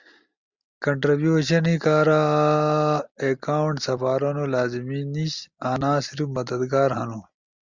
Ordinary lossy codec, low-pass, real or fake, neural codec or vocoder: Opus, 64 kbps; 7.2 kHz; real; none